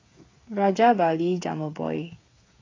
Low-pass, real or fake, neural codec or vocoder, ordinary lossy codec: 7.2 kHz; fake; codec, 16 kHz, 8 kbps, FreqCodec, smaller model; AAC, 32 kbps